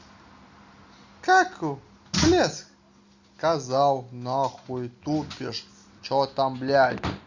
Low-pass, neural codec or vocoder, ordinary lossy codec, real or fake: 7.2 kHz; none; Opus, 64 kbps; real